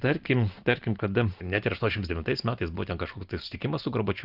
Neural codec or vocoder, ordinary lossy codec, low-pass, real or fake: none; Opus, 32 kbps; 5.4 kHz; real